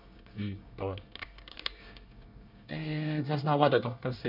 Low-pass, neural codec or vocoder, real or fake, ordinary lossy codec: 5.4 kHz; codec, 24 kHz, 1 kbps, SNAC; fake; none